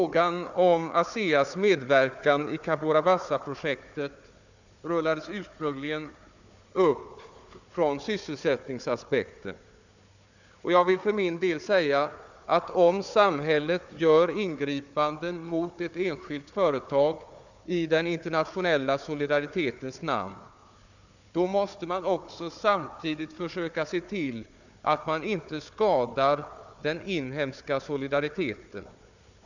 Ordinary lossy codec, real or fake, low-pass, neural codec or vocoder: none; fake; 7.2 kHz; codec, 16 kHz, 4 kbps, FunCodec, trained on Chinese and English, 50 frames a second